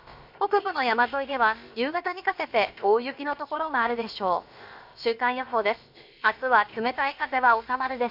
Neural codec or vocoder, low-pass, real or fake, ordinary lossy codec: codec, 16 kHz, about 1 kbps, DyCAST, with the encoder's durations; 5.4 kHz; fake; MP3, 48 kbps